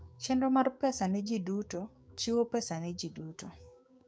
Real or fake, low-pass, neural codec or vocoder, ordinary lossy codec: fake; none; codec, 16 kHz, 6 kbps, DAC; none